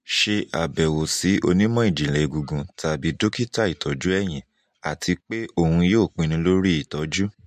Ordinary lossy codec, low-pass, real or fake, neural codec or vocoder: MP3, 64 kbps; 14.4 kHz; real; none